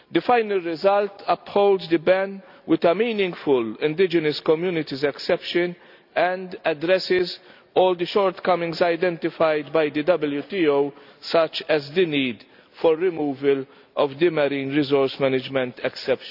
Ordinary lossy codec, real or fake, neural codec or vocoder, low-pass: none; real; none; 5.4 kHz